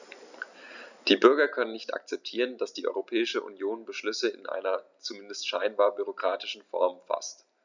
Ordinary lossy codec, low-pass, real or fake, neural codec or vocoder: none; 7.2 kHz; real; none